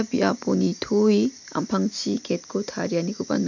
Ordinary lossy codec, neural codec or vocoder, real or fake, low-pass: none; none; real; 7.2 kHz